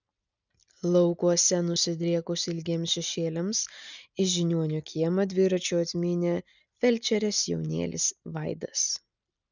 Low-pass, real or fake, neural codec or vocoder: 7.2 kHz; real; none